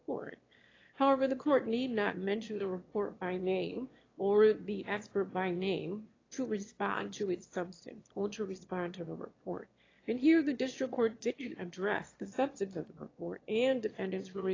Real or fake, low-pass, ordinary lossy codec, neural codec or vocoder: fake; 7.2 kHz; AAC, 32 kbps; autoencoder, 22.05 kHz, a latent of 192 numbers a frame, VITS, trained on one speaker